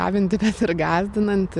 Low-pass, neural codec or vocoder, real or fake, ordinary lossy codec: 10.8 kHz; none; real; MP3, 96 kbps